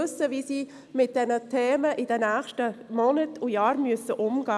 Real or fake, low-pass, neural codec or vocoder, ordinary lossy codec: real; none; none; none